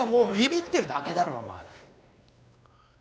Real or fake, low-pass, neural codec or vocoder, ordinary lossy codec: fake; none; codec, 16 kHz, 2 kbps, X-Codec, WavLM features, trained on Multilingual LibriSpeech; none